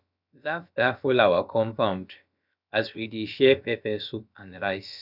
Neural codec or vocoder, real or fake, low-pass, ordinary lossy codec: codec, 16 kHz, about 1 kbps, DyCAST, with the encoder's durations; fake; 5.4 kHz; none